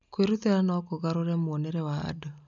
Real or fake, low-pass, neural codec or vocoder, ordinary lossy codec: real; 7.2 kHz; none; none